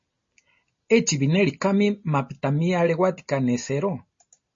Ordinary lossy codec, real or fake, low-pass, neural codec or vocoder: MP3, 32 kbps; real; 7.2 kHz; none